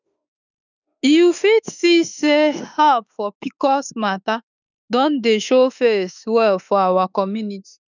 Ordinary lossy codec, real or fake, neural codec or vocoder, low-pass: none; fake; autoencoder, 48 kHz, 32 numbers a frame, DAC-VAE, trained on Japanese speech; 7.2 kHz